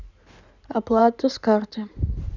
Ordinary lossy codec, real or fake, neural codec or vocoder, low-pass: none; fake; codec, 16 kHz in and 24 kHz out, 2.2 kbps, FireRedTTS-2 codec; 7.2 kHz